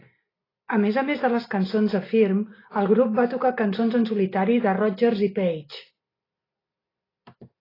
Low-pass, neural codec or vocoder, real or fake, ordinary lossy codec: 5.4 kHz; none; real; AAC, 24 kbps